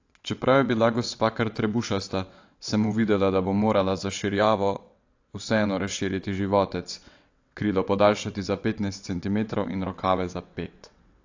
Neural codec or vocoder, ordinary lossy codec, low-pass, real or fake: vocoder, 22.05 kHz, 80 mel bands, WaveNeXt; AAC, 48 kbps; 7.2 kHz; fake